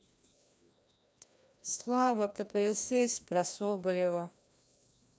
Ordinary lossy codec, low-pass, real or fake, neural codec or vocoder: none; none; fake; codec, 16 kHz, 1 kbps, FreqCodec, larger model